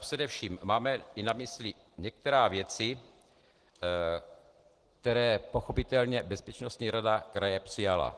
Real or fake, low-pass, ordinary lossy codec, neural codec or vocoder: real; 10.8 kHz; Opus, 16 kbps; none